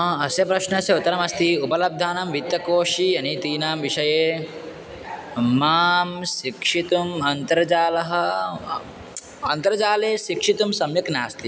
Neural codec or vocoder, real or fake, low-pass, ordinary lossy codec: none; real; none; none